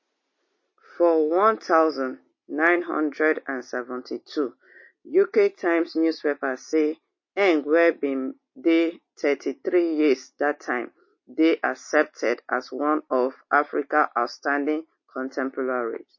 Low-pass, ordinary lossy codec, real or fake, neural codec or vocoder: 7.2 kHz; MP3, 32 kbps; real; none